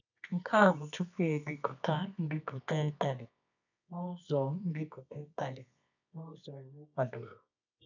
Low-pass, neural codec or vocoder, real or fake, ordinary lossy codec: 7.2 kHz; codec, 24 kHz, 0.9 kbps, WavTokenizer, medium music audio release; fake; none